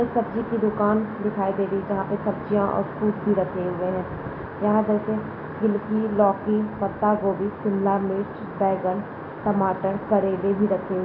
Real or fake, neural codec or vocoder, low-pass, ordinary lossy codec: real; none; 5.4 kHz; AAC, 24 kbps